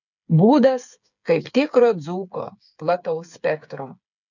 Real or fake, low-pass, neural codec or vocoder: fake; 7.2 kHz; codec, 16 kHz, 8 kbps, FreqCodec, smaller model